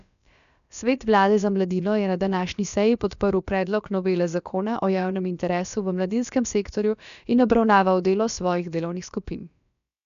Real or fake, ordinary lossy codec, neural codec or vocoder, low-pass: fake; none; codec, 16 kHz, about 1 kbps, DyCAST, with the encoder's durations; 7.2 kHz